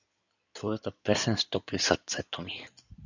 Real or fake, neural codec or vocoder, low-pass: fake; codec, 16 kHz in and 24 kHz out, 2.2 kbps, FireRedTTS-2 codec; 7.2 kHz